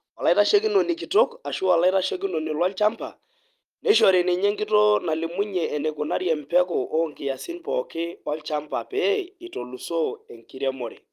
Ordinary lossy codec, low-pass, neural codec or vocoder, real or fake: Opus, 32 kbps; 14.4 kHz; vocoder, 44.1 kHz, 128 mel bands every 256 samples, BigVGAN v2; fake